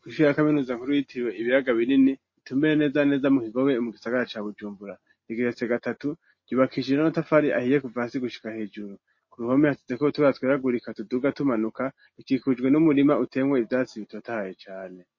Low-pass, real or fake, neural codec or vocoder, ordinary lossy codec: 7.2 kHz; real; none; MP3, 32 kbps